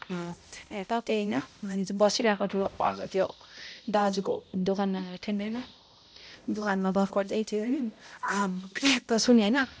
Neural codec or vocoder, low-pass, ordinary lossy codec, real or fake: codec, 16 kHz, 0.5 kbps, X-Codec, HuBERT features, trained on balanced general audio; none; none; fake